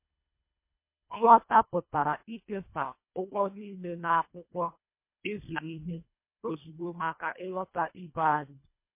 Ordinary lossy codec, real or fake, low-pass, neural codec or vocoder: MP3, 24 kbps; fake; 3.6 kHz; codec, 24 kHz, 1.5 kbps, HILCodec